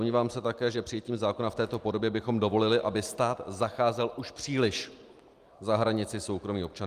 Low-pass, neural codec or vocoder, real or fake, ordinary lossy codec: 14.4 kHz; none; real; Opus, 32 kbps